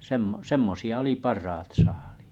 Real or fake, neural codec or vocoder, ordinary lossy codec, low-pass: fake; vocoder, 48 kHz, 128 mel bands, Vocos; none; 19.8 kHz